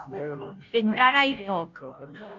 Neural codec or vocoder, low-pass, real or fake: codec, 16 kHz, 0.5 kbps, FunCodec, trained on Chinese and English, 25 frames a second; 7.2 kHz; fake